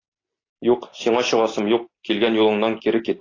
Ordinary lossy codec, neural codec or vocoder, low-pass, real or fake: AAC, 32 kbps; none; 7.2 kHz; real